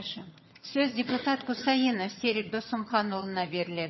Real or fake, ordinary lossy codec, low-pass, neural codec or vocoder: fake; MP3, 24 kbps; 7.2 kHz; vocoder, 22.05 kHz, 80 mel bands, HiFi-GAN